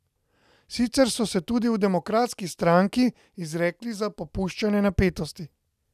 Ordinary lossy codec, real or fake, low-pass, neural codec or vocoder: none; real; 14.4 kHz; none